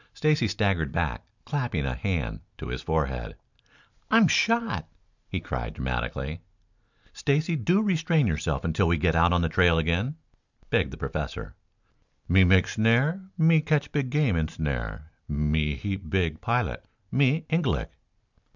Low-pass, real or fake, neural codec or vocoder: 7.2 kHz; real; none